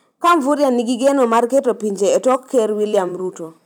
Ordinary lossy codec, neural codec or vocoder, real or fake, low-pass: none; vocoder, 44.1 kHz, 128 mel bands every 256 samples, BigVGAN v2; fake; none